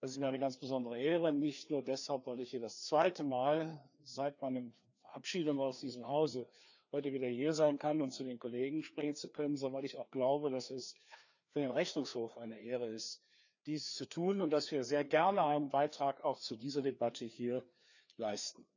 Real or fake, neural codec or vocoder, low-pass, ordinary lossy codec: fake; codec, 16 kHz, 2 kbps, FreqCodec, larger model; 7.2 kHz; none